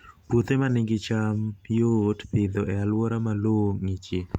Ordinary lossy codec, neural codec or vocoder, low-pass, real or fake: Opus, 64 kbps; none; 19.8 kHz; real